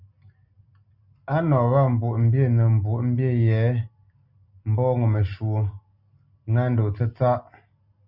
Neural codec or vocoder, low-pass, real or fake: none; 5.4 kHz; real